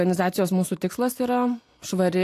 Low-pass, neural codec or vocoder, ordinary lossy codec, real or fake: 14.4 kHz; none; AAC, 64 kbps; real